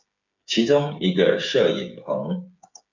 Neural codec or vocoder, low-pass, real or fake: codec, 16 kHz, 8 kbps, FreqCodec, smaller model; 7.2 kHz; fake